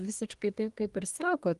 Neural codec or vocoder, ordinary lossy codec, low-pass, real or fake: codec, 24 kHz, 1 kbps, SNAC; Opus, 24 kbps; 10.8 kHz; fake